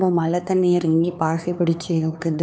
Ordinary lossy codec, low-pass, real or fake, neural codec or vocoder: none; none; fake; codec, 16 kHz, 4 kbps, X-Codec, HuBERT features, trained on LibriSpeech